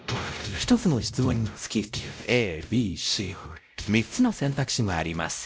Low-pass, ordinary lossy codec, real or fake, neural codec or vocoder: none; none; fake; codec, 16 kHz, 0.5 kbps, X-Codec, WavLM features, trained on Multilingual LibriSpeech